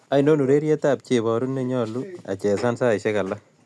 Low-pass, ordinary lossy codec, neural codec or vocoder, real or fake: none; none; none; real